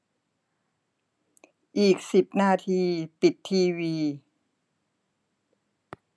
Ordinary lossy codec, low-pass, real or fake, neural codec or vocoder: none; none; real; none